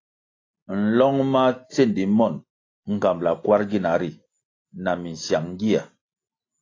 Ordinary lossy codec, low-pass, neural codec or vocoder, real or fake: AAC, 32 kbps; 7.2 kHz; none; real